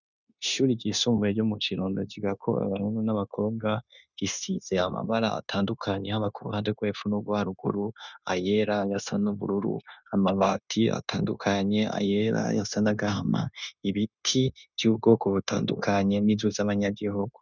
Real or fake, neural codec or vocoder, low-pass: fake; codec, 16 kHz, 0.9 kbps, LongCat-Audio-Codec; 7.2 kHz